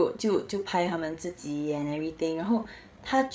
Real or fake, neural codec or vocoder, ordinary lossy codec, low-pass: fake; codec, 16 kHz, 8 kbps, FreqCodec, larger model; none; none